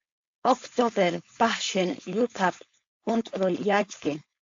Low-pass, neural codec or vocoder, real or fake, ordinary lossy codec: 7.2 kHz; codec, 16 kHz, 4.8 kbps, FACodec; fake; AAC, 48 kbps